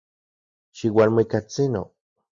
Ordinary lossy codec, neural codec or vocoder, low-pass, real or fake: Opus, 64 kbps; none; 7.2 kHz; real